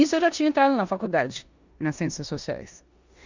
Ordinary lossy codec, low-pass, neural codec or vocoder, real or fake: Opus, 64 kbps; 7.2 kHz; codec, 16 kHz in and 24 kHz out, 0.9 kbps, LongCat-Audio-Codec, four codebook decoder; fake